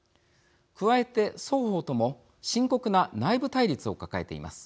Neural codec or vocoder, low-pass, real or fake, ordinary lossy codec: none; none; real; none